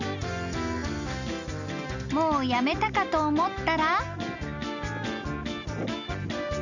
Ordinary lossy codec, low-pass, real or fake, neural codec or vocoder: none; 7.2 kHz; real; none